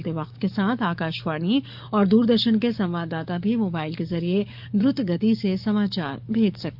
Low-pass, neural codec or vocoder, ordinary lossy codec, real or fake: 5.4 kHz; codec, 44.1 kHz, 7.8 kbps, DAC; AAC, 48 kbps; fake